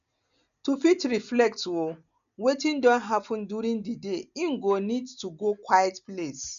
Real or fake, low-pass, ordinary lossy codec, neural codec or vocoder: real; 7.2 kHz; none; none